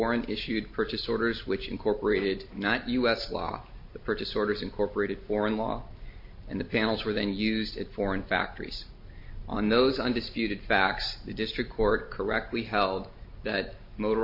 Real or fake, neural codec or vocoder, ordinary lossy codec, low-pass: real; none; MP3, 32 kbps; 5.4 kHz